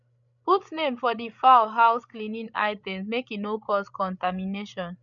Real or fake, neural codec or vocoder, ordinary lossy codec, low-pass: fake; codec, 16 kHz, 16 kbps, FreqCodec, larger model; none; 7.2 kHz